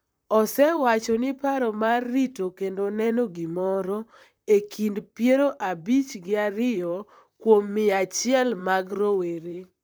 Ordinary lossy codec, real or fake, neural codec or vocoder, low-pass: none; fake; vocoder, 44.1 kHz, 128 mel bands, Pupu-Vocoder; none